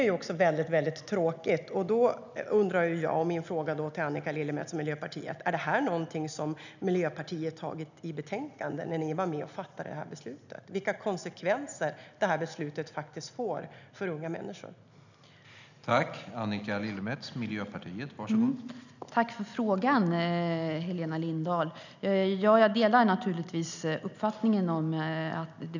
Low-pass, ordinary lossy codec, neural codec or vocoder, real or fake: 7.2 kHz; none; none; real